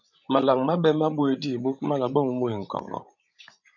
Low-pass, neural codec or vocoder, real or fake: 7.2 kHz; codec, 16 kHz, 16 kbps, FreqCodec, larger model; fake